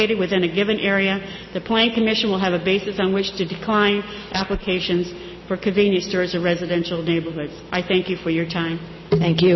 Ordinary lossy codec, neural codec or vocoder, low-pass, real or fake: MP3, 24 kbps; none; 7.2 kHz; real